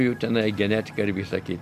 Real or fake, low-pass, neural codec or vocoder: real; 14.4 kHz; none